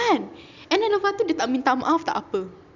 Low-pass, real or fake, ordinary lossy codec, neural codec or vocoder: 7.2 kHz; real; none; none